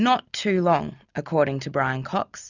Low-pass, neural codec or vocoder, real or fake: 7.2 kHz; none; real